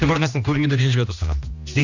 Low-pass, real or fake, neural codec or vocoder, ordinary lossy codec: 7.2 kHz; fake; codec, 16 kHz, 1 kbps, X-Codec, HuBERT features, trained on general audio; none